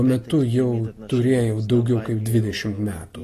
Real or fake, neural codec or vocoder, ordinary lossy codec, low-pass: real; none; AAC, 48 kbps; 14.4 kHz